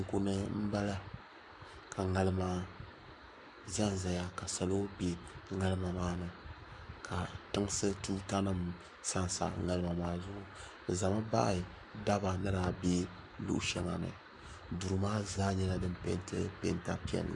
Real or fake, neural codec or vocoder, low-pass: fake; codec, 44.1 kHz, 7.8 kbps, Pupu-Codec; 10.8 kHz